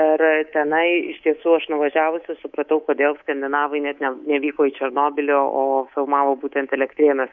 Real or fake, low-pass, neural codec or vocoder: fake; 7.2 kHz; codec, 24 kHz, 3.1 kbps, DualCodec